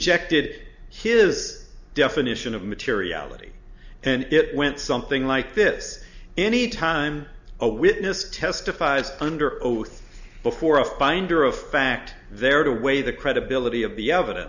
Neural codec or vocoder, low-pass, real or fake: none; 7.2 kHz; real